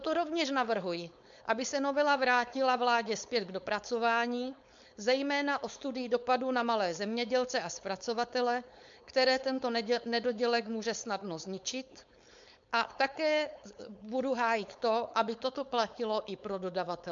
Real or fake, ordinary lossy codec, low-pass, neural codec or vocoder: fake; MP3, 64 kbps; 7.2 kHz; codec, 16 kHz, 4.8 kbps, FACodec